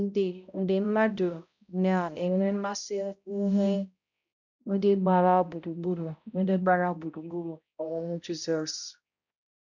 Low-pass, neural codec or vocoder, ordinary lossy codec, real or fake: 7.2 kHz; codec, 16 kHz, 0.5 kbps, X-Codec, HuBERT features, trained on balanced general audio; none; fake